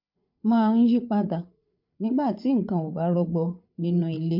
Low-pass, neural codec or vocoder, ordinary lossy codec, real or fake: 5.4 kHz; codec, 16 kHz, 8 kbps, FreqCodec, larger model; MP3, 48 kbps; fake